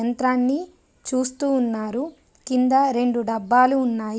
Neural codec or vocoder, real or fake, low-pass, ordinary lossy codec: none; real; none; none